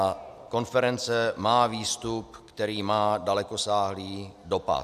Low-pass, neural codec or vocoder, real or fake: 14.4 kHz; none; real